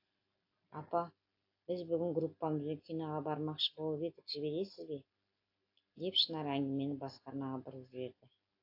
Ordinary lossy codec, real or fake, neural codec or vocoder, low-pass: AAC, 32 kbps; real; none; 5.4 kHz